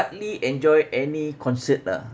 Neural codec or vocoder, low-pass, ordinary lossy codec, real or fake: none; none; none; real